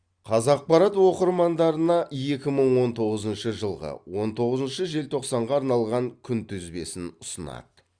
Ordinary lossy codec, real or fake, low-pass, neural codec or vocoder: Opus, 64 kbps; real; 9.9 kHz; none